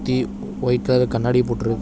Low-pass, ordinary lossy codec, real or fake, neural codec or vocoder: none; none; real; none